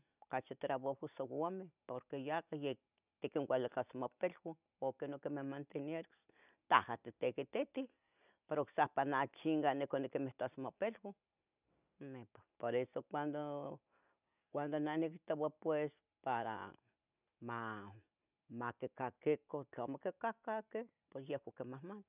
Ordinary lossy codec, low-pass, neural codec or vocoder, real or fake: none; 3.6 kHz; none; real